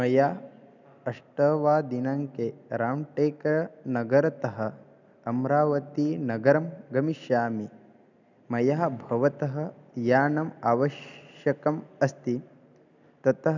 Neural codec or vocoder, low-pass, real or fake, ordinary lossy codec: none; 7.2 kHz; real; none